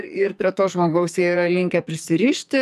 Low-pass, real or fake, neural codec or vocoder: 14.4 kHz; fake; codec, 44.1 kHz, 2.6 kbps, SNAC